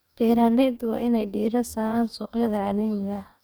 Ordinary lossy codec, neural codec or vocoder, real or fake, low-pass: none; codec, 44.1 kHz, 2.6 kbps, DAC; fake; none